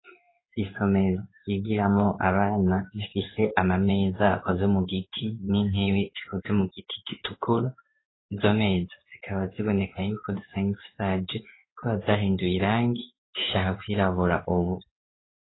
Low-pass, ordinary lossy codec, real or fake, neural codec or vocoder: 7.2 kHz; AAC, 16 kbps; fake; codec, 16 kHz in and 24 kHz out, 1 kbps, XY-Tokenizer